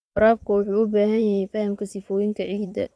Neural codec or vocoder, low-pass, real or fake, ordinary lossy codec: vocoder, 22.05 kHz, 80 mel bands, Vocos; none; fake; none